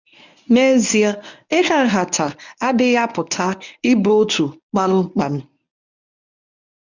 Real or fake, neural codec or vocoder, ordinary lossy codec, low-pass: fake; codec, 24 kHz, 0.9 kbps, WavTokenizer, medium speech release version 1; none; 7.2 kHz